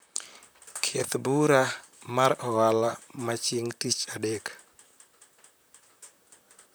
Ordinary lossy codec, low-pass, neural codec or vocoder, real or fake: none; none; vocoder, 44.1 kHz, 128 mel bands, Pupu-Vocoder; fake